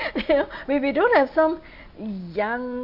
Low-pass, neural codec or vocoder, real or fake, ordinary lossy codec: 5.4 kHz; none; real; AAC, 48 kbps